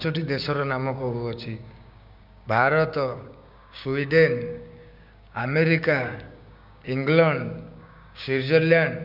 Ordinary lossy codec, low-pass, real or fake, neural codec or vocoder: none; 5.4 kHz; fake; codec, 16 kHz, 6 kbps, DAC